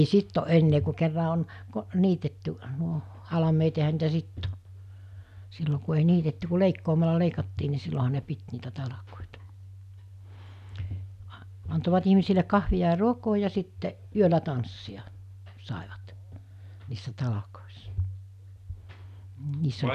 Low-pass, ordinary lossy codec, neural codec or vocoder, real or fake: 14.4 kHz; none; none; real